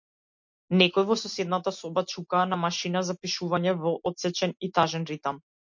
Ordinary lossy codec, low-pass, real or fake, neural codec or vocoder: MP3, 48 kbps; 7.2 kHz; real; none